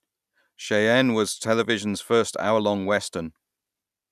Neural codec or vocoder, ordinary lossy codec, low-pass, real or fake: none; none; 14.4 kHz; real